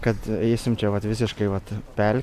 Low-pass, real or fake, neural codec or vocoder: 14.4 kHz; real; none